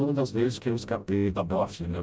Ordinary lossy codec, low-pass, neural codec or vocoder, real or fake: none; none; codec, 16 kHz, 0.5 kbps, FreqCodec, smaller model; fake